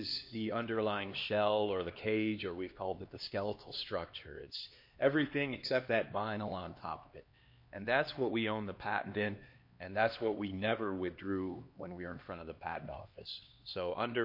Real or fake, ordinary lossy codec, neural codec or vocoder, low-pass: fake; MP3, 32 kbps; codec, 16 kHz, 2 kbps, X-Codec, HuBERT features, trained on LibriSpeech; 5.4 kHz